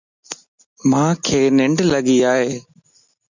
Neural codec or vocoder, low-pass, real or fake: none; 7.2 kHz; real